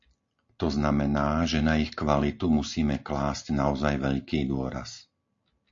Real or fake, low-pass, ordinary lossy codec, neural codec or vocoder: real; 7.2 kHz; AAC, 48 kbps; none